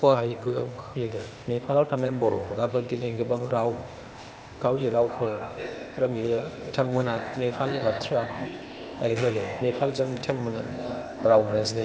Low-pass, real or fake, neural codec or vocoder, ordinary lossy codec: none; fake; codec, 16 kHz, 0.8 kbps, ZipCodec; none